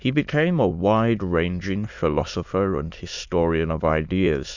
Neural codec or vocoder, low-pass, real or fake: autoencoder, 22.05 kHz, a latent of 192 numbers a frame, VITS, trained on many speakers; 7.2 kHz; fake